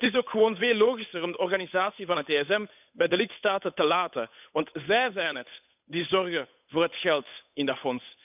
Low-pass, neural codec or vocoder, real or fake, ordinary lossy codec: 3.6 kHz; codec, 16 kHz, 8 kbps, FunCodec, trained on Chinese and English, 25 frames a second; fake; none